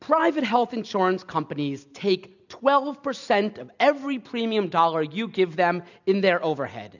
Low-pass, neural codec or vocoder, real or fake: 7.2 kHz; none; real